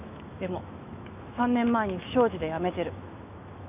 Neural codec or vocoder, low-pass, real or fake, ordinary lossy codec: autoencoder, 48 kHz, 128 numbers a frame, DAC-VAE, trained on Japanese speech; 3.6 kHz; fake; none